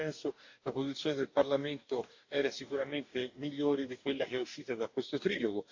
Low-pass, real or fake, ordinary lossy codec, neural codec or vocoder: 7.2 kHz; fake; AAC, 48 kbps; codec, 44.1 kHz, 2.6 kbps, DAC